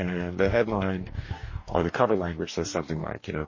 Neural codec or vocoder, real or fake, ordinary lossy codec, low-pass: codec, 44.1 kHz, 2.6 kbps, DAC; fake; MP3, 32 kbps; 7.2 kHz